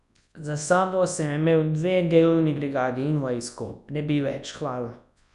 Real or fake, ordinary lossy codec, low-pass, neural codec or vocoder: fake; none; 10.8 kHz; codec, 24 kHz, 0.9 kbps, WavTokenizer, large speech release